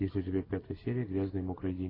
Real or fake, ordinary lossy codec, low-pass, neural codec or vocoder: real; AAC, 24 kbps; 5.4 kHz; none